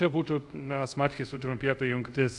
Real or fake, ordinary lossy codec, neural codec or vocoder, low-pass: fake; AAC, 64 kbps; codec, 24 kHz, 0.5 kbps, DualCodec; 10.8 kHz